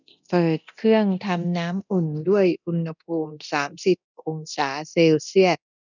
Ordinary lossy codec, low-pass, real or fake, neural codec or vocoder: none; 7.2 kHz; fake; codec, 24 kHz, 0.9 kbps, DualCodec